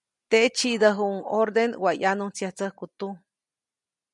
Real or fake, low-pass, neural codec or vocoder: real; 10.8 kHz; none